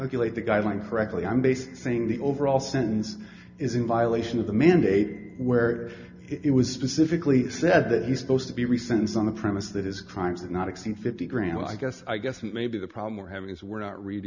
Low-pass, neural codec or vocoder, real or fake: 7.2 kHz; none; real